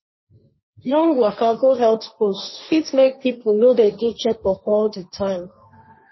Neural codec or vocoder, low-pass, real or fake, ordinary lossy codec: codec, 16 kHz, 1.1 kbps, Voila-Tokenizer; 7.2 kHz; fake; MP3, 24 kbps